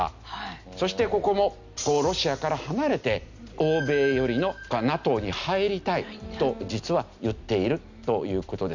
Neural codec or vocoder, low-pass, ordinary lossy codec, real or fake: none; 7.2 kHz; none; real